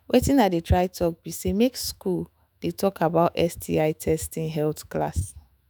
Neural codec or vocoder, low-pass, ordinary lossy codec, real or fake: autoencoder, 48 kHz, 128 numbers a frame, DAC-VAE, trained on Japanese speech; none; none; fake